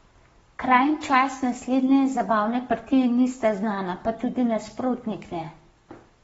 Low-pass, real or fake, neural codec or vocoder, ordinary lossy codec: 19.8 kHz; fake; codec, 44.1 kHz, 7.8 kbps, Pupu-Codec; AAC, 24 kbps